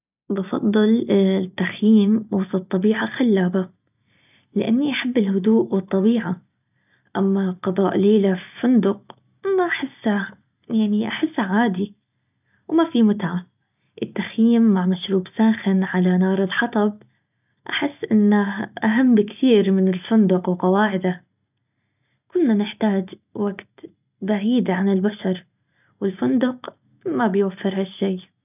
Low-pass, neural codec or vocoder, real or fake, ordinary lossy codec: 3.6 kHz; none; real; none